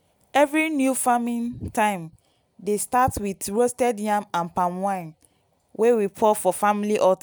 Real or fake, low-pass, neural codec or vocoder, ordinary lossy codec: real; none; none; none